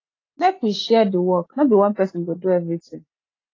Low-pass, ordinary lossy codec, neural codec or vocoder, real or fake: 7.2 kHz; AAC, 32 kbps; none; real